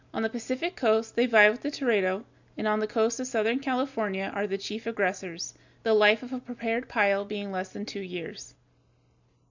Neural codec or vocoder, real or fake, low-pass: none; real; 7.2 kHz